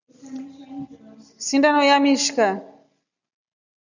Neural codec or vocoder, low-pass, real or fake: none; 7.2 kHz; real